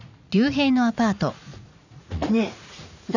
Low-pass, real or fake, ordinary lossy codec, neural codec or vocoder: 7.2 kHz; fake; none; vocoder, 44.1 kHz, 80 mel bands, Vocos